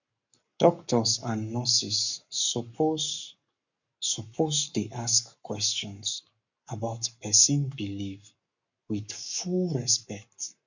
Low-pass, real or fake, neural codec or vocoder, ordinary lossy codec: 7.2 kHz; fake; codec, 44.1 kHz, 7.8 kbps, Pupu-Codec; none